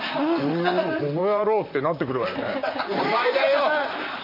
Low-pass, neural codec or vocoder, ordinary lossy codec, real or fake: 5.4 kHz; vocoder, 44.1 kHz, 128 mel bands, Pupu-Vocoder; AAC, 48 kbps; fake